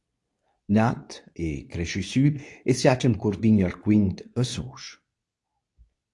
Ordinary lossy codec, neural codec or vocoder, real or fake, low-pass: AAC, 64 kbps; codec, 24 kHz, 0.9 kbps, WavTokenizer, medium speech release version 2; fake; 10.8 kHz